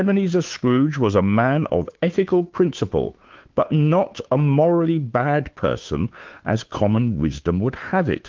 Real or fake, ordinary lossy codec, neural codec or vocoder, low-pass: fake; Opus, 32 kbps; codec, 16 kHz, 2 kbps, FunCodec, trained on Chinese and English, 25 frames a second; 7.2 kHz